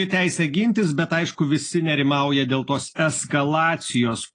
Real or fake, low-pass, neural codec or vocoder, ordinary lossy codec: real; 9.9 kHz; none; AAC, 32 kbps